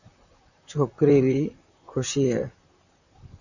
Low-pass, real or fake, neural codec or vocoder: 7.2 kHz; fake; vocoder, 44.1 kHz, 128 mel bands, Pupu-Vocoder